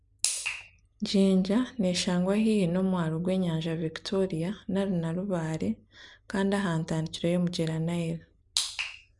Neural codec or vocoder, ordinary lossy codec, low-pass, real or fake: none; none; 10.8 kHz; real